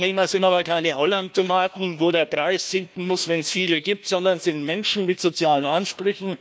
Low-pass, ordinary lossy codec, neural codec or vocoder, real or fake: none; none; codec, 16 kHz, 1 kbps, FunCodec, trained on Chinese and English, 50 frames a second; fake